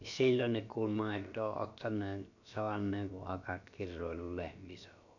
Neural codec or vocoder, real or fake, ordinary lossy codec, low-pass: codec, 16 kHz, about 1 kbps, DyCAST, with the encoder's durations; fake; none; 7.2 kHz